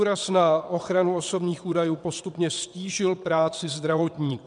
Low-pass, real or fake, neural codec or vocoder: 9.9 kHz; fake; vocoder, 22.05 kHz, 80 mel bands, WaveNeXt